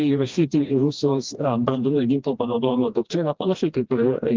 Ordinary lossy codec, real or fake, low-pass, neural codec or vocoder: Opus, 32 kbps; fake; 7.2 kHz; codec, 16 kHz, 1 kbps, FreqCodec, smaller model